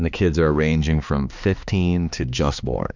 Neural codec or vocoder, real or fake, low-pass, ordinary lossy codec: codec, 16 kHz, 2 kbps, X-Codec, HuBERT features, trained on balanced general audio; fake; 7.2 kHz; Opus, 64 kbps